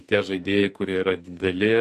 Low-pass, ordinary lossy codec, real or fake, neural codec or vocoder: 14.4 kHz; MP3, 64 kbps; fake; codec, 44.1 kHz, 2.6 kbps, SNAC